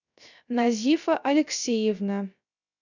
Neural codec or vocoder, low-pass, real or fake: codec, 16 kHz, 0.3 kbps, FocalCodec; 7.2 kHz; fake